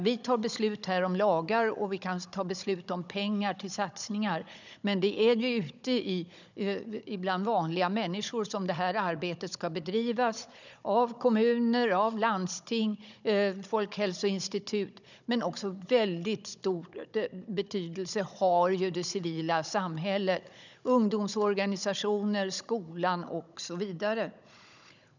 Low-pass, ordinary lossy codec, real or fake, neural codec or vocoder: 7.2 kHz; none; fake; codec, 16 kHz, 16 kbps, FunCodec, trained on Chinese and English, 50 frames a second